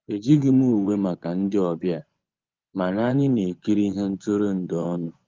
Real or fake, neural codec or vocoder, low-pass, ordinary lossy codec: fake; vocoder, 24 kHz, 100 mel bands, Vocos; 7.2 kHz; Opus, 32 kbps